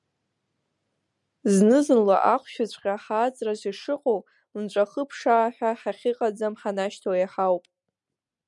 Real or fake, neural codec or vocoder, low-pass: real; none; 10.8 kHz